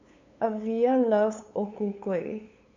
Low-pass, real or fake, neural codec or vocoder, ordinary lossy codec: 7.2 kHz; fake; codec, 16 kHz, 8 kbps, FunCodec, trained on LibriTTS, 25 frames a second; MP3, 64 kbps